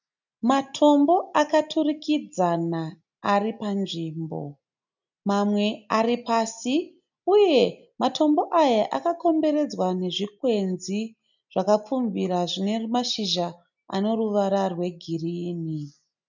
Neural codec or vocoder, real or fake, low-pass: none; real; 7.2 kHz